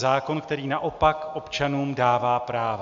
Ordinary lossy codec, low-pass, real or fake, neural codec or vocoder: AAC, 64 kbps; 7.2 kHz; real; none